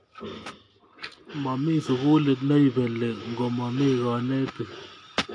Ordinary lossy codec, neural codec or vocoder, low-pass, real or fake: AAC, 48 kbps; none; 9.9 kHz; real